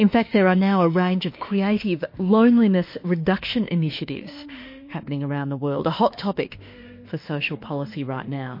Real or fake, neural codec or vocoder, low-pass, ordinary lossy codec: fake; autoencoder, 48 kHz, 32 numbers a frame, DAC-VAE, trained on Japanese speech; 5.4 kHz; MP3, 32 kbps